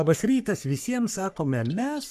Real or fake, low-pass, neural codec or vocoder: fake; 14.4 kHz; codec, 44.1 kHz, 3.4 kbps, Pupu-Codec